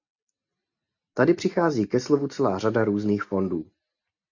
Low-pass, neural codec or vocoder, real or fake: 7.2 kHz; none; real